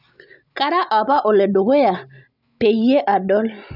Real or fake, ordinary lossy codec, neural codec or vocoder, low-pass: real; none; none; 5.4 kHz